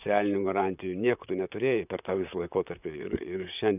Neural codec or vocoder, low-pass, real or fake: vocoder, 44.1 kHz, 128 mel bands, Pupu-Vocoder; 3.6 kHz; fake